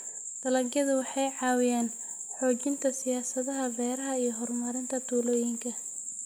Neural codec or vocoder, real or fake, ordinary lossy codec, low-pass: none; real; none; none